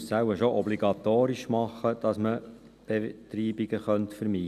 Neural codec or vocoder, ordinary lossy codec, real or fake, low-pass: none; none; real; 14.4 kHz